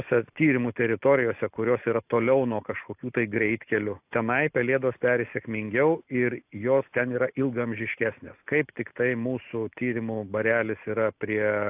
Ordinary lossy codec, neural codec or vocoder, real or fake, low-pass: AAC, 32 kbps; none; real; 3.6 kHz